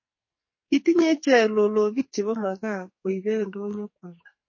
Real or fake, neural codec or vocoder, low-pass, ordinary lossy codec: fake; codec, 44.1 kHz, 2.6 kbps, SNAC; 7.2 kHz; MP3, 32 kbps